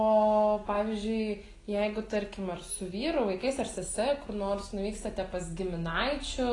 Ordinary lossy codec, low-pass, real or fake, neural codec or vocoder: AAC, 32 kbps; 10.8 kHz; real; none